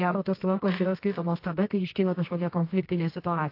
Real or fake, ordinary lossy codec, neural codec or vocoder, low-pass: fake; AAC, 32 kbps; codec, 24 kHz, 0.9 kbps, WavTokenizer, medium music audio release; 5.4 kHz